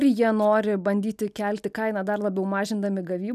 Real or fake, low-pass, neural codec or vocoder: real; 14.4 kHz; none